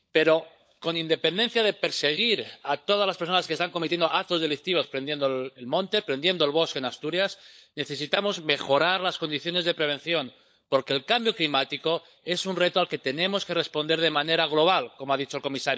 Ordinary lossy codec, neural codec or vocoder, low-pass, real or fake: none; codec, 16 kHz, 16 kbps, FunCodec, trained on LibriTTS, 50 frames a second; none; fake